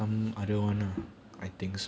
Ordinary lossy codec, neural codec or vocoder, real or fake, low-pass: none; none; real; none